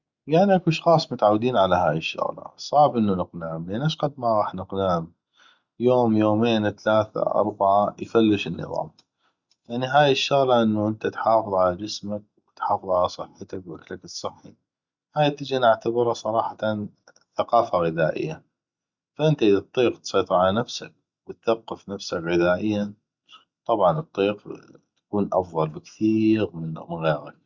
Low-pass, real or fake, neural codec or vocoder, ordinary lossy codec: 7.2 kHz; real; none; Opus, 64 kbps